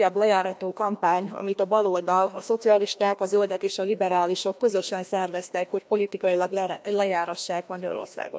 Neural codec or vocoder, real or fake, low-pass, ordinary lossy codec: codec, 16 kHz, 1 kbps, FreqCodec, larger model; fake; none; none